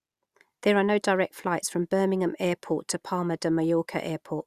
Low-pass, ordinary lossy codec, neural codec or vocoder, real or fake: 14.4 kHz; Opus, 64 kbps; none; real